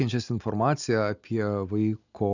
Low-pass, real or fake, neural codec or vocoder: 7.2 kHz; real; none